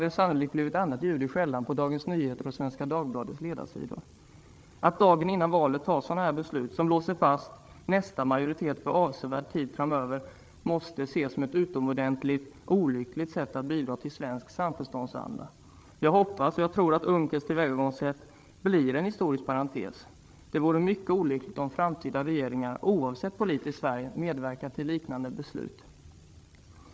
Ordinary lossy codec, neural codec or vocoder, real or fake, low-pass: none; codec, 16 kHz, 8 kbps, FreqCodec, larger model; fake; none